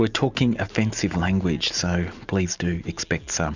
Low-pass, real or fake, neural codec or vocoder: 7.2 kHz; real; none